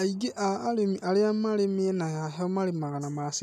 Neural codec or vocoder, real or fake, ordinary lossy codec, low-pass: none; real; none; 14.4 kHz